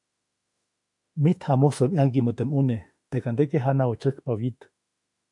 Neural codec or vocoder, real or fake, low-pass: autoencoder, 48 kHz, 32 numbers a frame, DAC-VAE, trained on Japanese speech; fake; 10.8 kHz